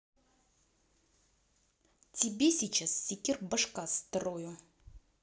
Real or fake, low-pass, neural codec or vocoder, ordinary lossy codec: real; none; none; none